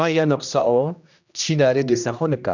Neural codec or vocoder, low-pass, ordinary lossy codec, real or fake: codec, 16 kHz, 1 kbps, X-Codec, HuBERT features, trained on general audio; 7.2 kHz; none; fake